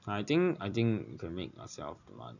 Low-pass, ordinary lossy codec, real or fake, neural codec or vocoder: 7.2 kHz; none; real; none